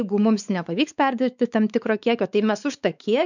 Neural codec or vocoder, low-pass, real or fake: codec, 16 kHz, 4 kbps, X-Codec, WavLM features, trained on Multilingual LibriSpeech; 7.2 kHz; fake